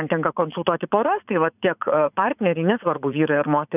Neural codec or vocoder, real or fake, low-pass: vocoder, 44.1 kHz, 80 mel bands, Vocos; fake; 3.6 kHz